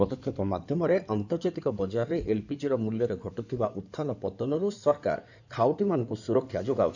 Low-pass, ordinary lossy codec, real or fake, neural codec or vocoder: 7.2 kHz; none; fake; codec, 16 kHz in and 24 kHz out, 2.2 kbps, FireRedTTS-2 codec